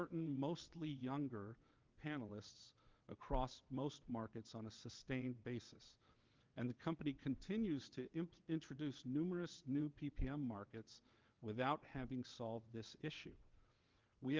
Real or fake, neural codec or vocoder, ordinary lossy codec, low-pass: fake; vocoder, 22.05 kHz, 80 mel bands, WaveNeXt; Opus, 24 kbps; 7.2 kHz